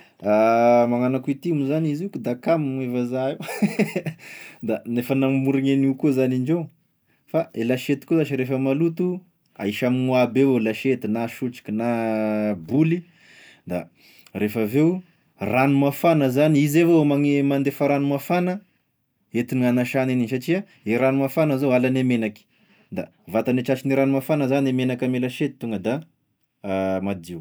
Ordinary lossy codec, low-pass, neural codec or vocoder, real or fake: none; none; none; real